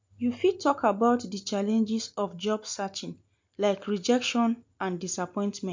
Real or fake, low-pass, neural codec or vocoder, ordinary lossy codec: real; 7.2 kHz; none; MP3, 64 kbps